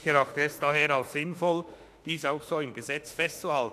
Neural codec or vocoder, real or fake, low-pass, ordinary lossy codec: autoencoder, 48 kHz, 32 numbers a frame, DAC-VAE, trained on Japanese speech; fake; 14.4 kHz; AAC, 64 kbps